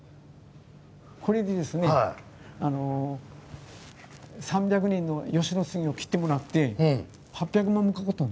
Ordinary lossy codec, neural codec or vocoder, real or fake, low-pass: none; none; real; none